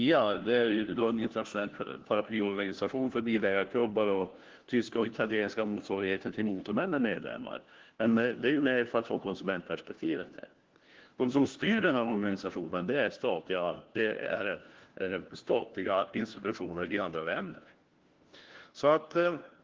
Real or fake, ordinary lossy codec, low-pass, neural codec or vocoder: fake; Opus, 16 kbps; 7.2 kHz; codec, 16 kHz, 1 kbps, FunCodec, trained on LibriTTS, 50 frames a second